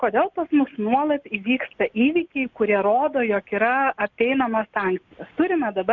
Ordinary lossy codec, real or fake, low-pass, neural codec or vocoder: MP3, 64 kbps; real; 7.2 kHz; none